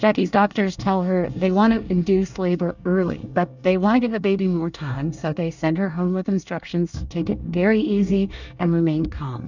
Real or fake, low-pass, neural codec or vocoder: fake; 7.2 kHz; codec, 24 kHz, 1 kbps, SNAC